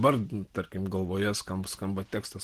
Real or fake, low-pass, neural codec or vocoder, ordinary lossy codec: real; 14.4 kHz; none; Opus, 16 kbps